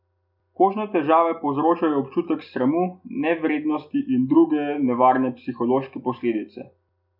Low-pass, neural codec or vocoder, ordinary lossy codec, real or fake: 5.4 kHz; none; none; real